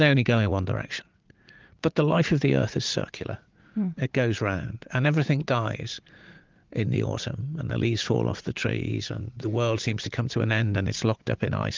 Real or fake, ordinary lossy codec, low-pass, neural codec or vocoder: fake; Opus, 32 kbps; 7.2 kHz; vocoder, 44.1 kHz, 80 mel bands, Vocos